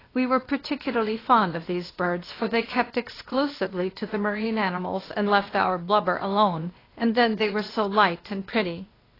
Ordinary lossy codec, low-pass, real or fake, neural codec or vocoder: AAC, 24 kbps; 5.4 kHz; fake; codec, 16 kHz, 0.8 kbps, ZipCodec